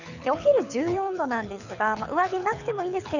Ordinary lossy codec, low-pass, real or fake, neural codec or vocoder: none; 7.2 kHz; fake; codec, 24 kHz, 6 kbps, HILCodec